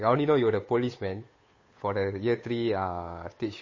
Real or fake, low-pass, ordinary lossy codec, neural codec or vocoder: fake; 7.2 kHz; MP3, 32 kbps; codec, 16 kHz, 8 kbps, FunCodec, trained on Chinese and English, 25 frames a second